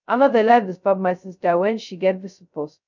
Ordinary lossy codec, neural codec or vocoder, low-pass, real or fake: none; codec, 16 kHz, 0.2 kbps, FocalCodec; 7.2 kHz; fake